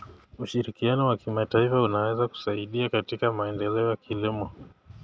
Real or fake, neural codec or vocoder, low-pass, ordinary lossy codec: real; none; none; none